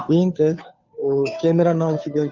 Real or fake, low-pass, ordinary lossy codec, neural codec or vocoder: fake; 7.2 kHz; none; codec, 16 kHz, 2 kbps, FunCodec, trained on Chinese and English, 25 frames a second